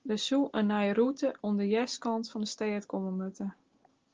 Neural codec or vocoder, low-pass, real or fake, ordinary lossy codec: none; 7.2 kHz; real; Opus, 16 kbps